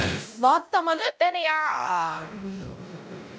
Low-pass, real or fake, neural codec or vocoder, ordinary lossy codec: none; fake; codec, 16 kHz, 0.5 kbps, X-Codec, WavLM features, trained on Multilingual LibriSpeech; none